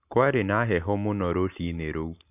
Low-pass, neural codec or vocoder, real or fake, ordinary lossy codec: 3.6 kHz; none; real; none